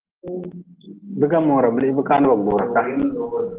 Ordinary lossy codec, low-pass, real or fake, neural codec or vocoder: Opus, 16 kbps; 3.6 kHz; real; none